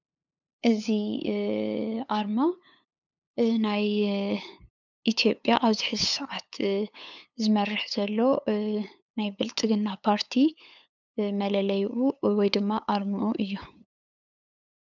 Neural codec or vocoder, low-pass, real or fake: codec, 16 kHz, 8 kbps, FunCodec, trained on LibriTTS, 25 frames a second; 7.2 kHz; fake